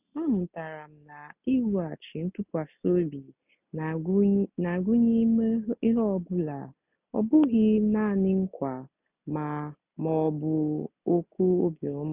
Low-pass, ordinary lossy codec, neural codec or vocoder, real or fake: 3.6 kHz; none; none; real